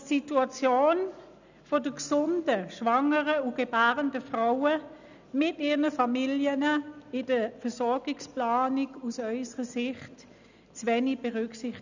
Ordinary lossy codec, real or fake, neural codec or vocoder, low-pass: none; real; none; 7.2 kHz